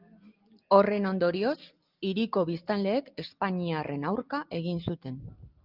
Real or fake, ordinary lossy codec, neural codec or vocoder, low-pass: real; Opus, 24 kbps; none; 5.4 kHz